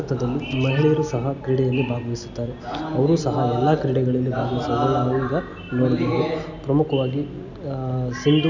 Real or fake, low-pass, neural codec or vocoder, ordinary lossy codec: real; 7.2 kHz; none; none